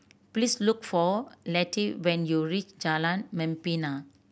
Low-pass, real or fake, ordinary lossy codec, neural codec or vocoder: none; real; none; none